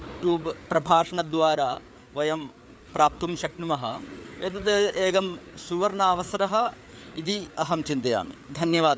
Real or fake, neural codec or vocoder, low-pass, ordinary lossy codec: fake; codec, 16 kHz, 4 kbps, FreqCodec, larger model; none; none